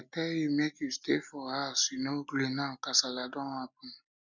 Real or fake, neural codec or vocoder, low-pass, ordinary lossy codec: real; none; 7.2 kHz; none